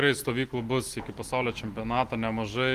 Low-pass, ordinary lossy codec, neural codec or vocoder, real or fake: 14.4 kHz; Opus, 24 kbps; none; real